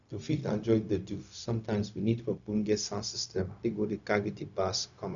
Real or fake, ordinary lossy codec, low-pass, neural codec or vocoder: fake; none; 7.2 kHz; codec, 16 kHz, 0.4 kbps, LongCat-Audio-Codec